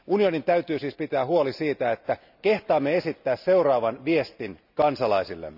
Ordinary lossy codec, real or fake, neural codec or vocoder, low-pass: none; real; none; 5.4 kHz